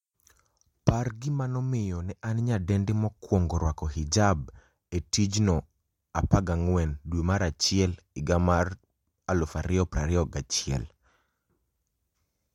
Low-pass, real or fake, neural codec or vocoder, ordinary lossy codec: 19.8 kHz; real; none; MP3, 64 kbps